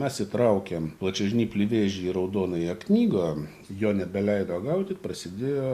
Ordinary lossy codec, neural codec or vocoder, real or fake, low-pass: Opus, 64 kbps; none; real; 14.4 kHz